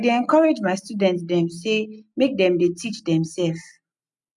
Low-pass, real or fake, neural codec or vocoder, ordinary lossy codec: 10.8 kHz; real; none; none